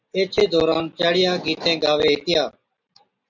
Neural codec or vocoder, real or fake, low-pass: none; real; 7.2 kHz